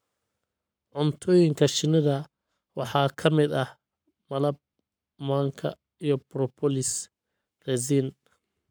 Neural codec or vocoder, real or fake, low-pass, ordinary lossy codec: codec, 44.1 kHz, 7.8 kbps, Pupu-Codec; fake; none; none